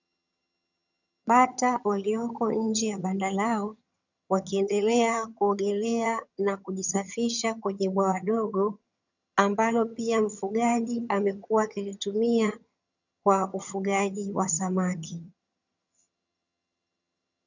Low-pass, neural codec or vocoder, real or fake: 7.2 kHz; vocoder, 22.05 kHz, 80 mel bands, HiFi-GAN; fake